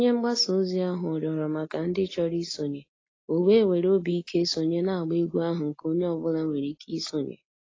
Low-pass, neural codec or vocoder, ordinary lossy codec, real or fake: 7.2 kHz; none; AAC, 32 kbps; real